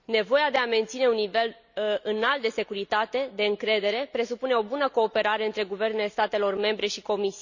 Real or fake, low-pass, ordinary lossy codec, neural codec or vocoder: real; 7.2 kHz; none; none